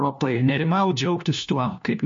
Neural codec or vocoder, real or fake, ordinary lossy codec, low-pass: codec, 16 kHz, 1 kbps, FunCodec, trained on LibriTTS, 50 frames a second; fake; MP3, 48 kbps; 7.2 kHz